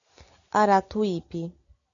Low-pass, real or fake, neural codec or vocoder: 7.2 kHz; real; none